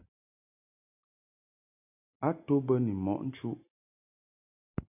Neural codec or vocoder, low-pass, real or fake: none; 3.6 kHz; real